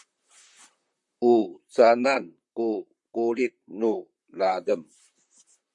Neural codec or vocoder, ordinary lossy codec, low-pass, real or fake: vocoder, 44.1 kHz, 128 mel bands, Pupu-Vocoder; Opus, 64 kbps; 10.8 kHz; fake